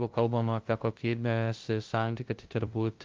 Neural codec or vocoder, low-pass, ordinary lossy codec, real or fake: codec, 16 kHz, 0.5 kbps, FunCodec, trained on LibriTTS, 25 frames a second; 7.2 kHz; Opus, 32 kbps; fake